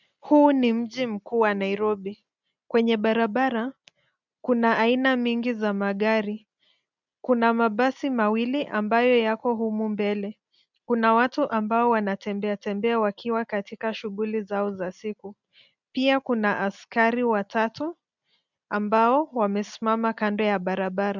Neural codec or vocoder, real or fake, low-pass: none; real; 7.2 kHz